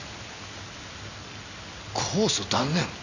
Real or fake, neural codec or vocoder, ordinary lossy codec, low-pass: real; none; none; 7.2 kHz